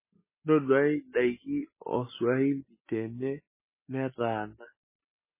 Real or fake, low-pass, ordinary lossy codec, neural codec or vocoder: real; 3.6 kHz; MP3, 16 kbps; none